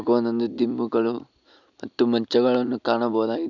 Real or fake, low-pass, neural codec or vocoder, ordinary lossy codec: real; 7.2 kHz; none; none